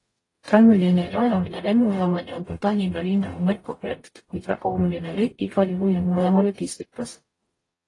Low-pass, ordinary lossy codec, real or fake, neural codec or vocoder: 10.8 kHz; AAC, 32 kbps; fake; codec, 44.1 kHz, 0.9 kbps, DAC